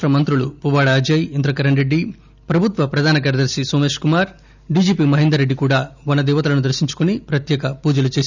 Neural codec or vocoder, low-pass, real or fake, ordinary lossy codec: none; 7.2 kHz; real; none